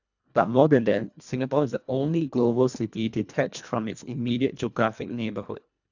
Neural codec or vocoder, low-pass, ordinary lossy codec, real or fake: codec, 24 kHz, 1.5 kbps, HILCodec; 7.2 kHz; none; fake